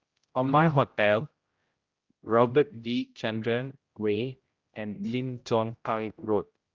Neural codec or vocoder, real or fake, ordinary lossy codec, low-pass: codec, 16 kHz, 0.5 kbps, X-Codec, HuBERT features, trained on general audio; fake; Opus, 32 kbps; 7.2 kHz